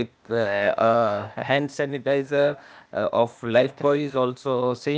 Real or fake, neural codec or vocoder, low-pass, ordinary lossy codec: fake; codec, 16 kHz, 0.8 kbps, ZipCodec; none; none